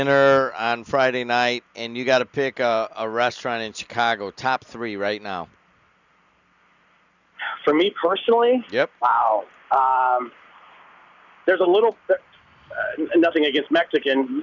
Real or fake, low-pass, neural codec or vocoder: real; 7.2 kHz; none